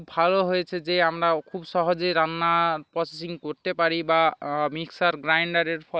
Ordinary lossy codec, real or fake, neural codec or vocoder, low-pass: none; real; none; none